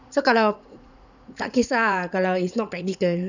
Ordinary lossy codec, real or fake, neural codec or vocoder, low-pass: none; fake; vocoder, 44.1 kHz, 80 mel bands, Vocos; 7.2 kHz